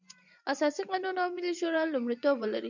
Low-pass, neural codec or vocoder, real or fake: 7.2 kHz; vocoder, 24 kHz, 100 mel bands, Vocos; fake